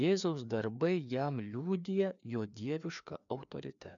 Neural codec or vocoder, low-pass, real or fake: codec, 16 kHz, 2 kbps, FreqCodec, larger model; 7.2 kHz; fake